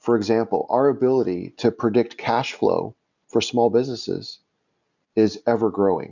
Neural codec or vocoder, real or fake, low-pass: none; real; 7.2 kHz